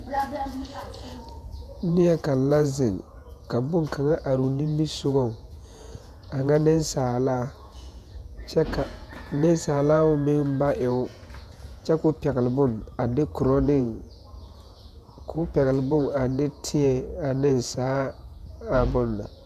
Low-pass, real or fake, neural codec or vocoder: 14.4 kHz; fake; vocoder, 48 kHz, 128 mel bands, Vocos